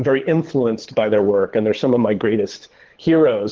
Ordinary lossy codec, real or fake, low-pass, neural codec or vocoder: Opus, 16 kbps; fake; 7.2 kHz; codec, 24 kHz, 6 kbps, HILCodec